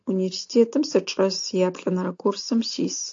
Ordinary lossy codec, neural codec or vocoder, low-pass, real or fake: AAC, 48 kbps; none; 7.2 kHz; real